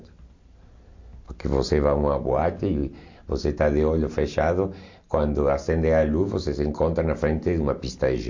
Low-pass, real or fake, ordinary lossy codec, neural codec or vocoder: 7.2 kHz; real; MP3, 48 kbps; none